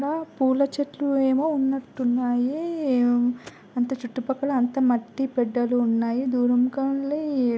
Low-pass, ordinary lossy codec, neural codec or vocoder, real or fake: none; none; none; real